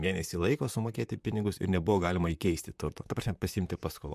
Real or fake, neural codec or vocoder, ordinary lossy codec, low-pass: fake; vocoder, 44.1 kHz, 128 mel bands, Pupu-Vocoder; MP3, 96 kbps; 14.4 kHz